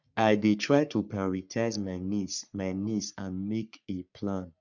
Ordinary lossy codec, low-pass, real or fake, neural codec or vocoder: none; 7.2 kHz; fake; codec, 44.1 kHz, 3.4 kbps, Pupu-Codec